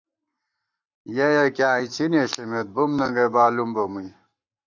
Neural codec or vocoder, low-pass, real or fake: codec, 44.1 kHz, 7.8 kbps, Pupu-Codec; 7.2 kHz; fake